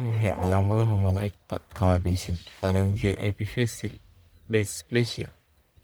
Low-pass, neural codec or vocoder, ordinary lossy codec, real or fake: none; codec, 44.1 kHz, 1.7 kbps, Pupu-Codec; none; fake